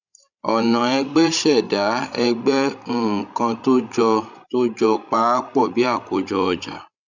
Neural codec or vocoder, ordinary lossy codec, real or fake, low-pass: codec, 16 kHz, 16 kbps, FreqCodec, larger model; none; fake; 7.2 kHz